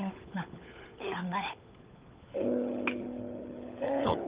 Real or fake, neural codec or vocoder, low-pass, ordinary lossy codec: fake; codec, 16 kHz, 16 kbps, FunCodec, trained on LibriTTS, 50 frames a second; 3.6 kHz; Opus, 32 kbps